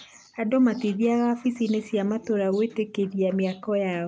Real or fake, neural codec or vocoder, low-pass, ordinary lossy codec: real; none; none; none